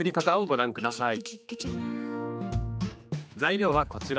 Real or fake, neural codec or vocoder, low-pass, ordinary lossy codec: fake; codec, 16 kHz, 2 kbps, X-Codec, HuBERT features, trained on general audio; none; none